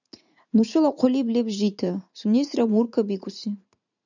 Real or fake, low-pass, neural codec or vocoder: fake; 7.2 kHz; vocoder, 44.1 kHz, 80 mel bands, Vocos